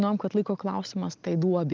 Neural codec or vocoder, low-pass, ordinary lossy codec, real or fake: none; 7.2 kHz; Opus, 24 kbps; real